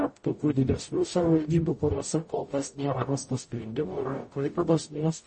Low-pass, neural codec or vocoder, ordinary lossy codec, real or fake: 10.8 kHz; codec, 44.1 kHz, 0.9 kbps, DAC; MP3, 32 kbps; fake